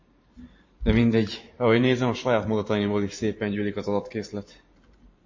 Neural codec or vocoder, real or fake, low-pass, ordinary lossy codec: vocoder, 44.1 kHz, 128 mel bands every 512 samples, BigVGAN v2; fake; 7.2 kHz; MP3, 32 kbps